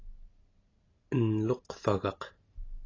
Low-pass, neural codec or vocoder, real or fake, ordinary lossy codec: 7.2 kHz; none; real; AAC, 32 kbps